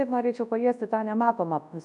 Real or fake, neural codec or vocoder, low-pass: fake; codec, 24 kHz, 0.9 kbps, WavTokenizer, large speech release; 10.8 kHz